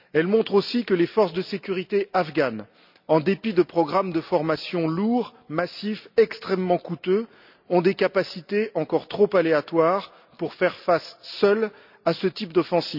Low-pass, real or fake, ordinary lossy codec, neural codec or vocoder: 5.4 kHz; real; none; none